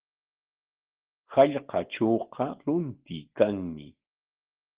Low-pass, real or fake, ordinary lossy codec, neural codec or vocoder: 3.6 kHz; real; Opus, 16 kbps; none